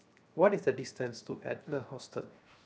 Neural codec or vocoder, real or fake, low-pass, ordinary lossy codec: codec, 16 kHz, 0.7 kbps, FocalCodec; fake; none; none